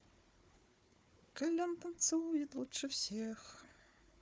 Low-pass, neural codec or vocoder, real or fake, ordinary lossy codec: none; codec, 16 kHz, 4 kbps, FunCodec, trained on Chinese and English, 50 frames a second; fake; none